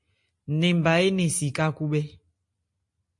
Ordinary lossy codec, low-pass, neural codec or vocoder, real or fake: MP3, 48 kbps; 10.8 kHz; none; real